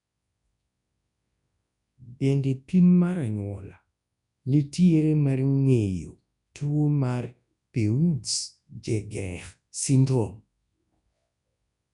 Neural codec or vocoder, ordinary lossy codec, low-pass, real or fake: codec, 24 kHz, 0.9 kbps, WavTokenizer, large speech release; none; 10.8 kHz; fake